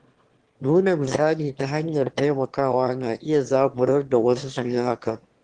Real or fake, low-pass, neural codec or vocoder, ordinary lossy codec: fake; 9.9 kHz; autoencoder, 22.05 kHz, a latent of 192 numbers a frame, VITS, trained on one speaker; Opus, 16 kbps